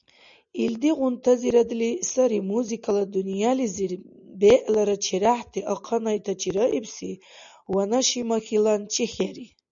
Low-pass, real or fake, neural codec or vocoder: 7.2 kHz; real; none